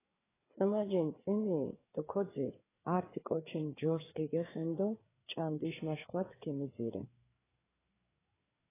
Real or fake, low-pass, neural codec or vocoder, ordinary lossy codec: fake; 3.6 kHz; codec, 16 kHz, 4 kbps, FreqCodec, larger model; AAC, 16 kbps